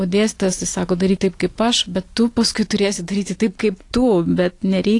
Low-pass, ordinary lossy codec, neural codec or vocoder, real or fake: 10.8 kHz; AAC, 48 kbps; none; real